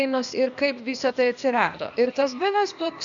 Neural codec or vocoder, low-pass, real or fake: codec, 16 kHz, 0.8 kbps, ZipCodec; 7.2 kHz; fake